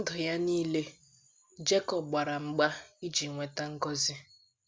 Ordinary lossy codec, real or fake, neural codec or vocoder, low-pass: none; real; none; none